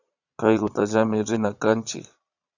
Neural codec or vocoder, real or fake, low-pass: vocoder, 22.05 kHz, 80 mel bands, Vocos; fake; 7.2 kHz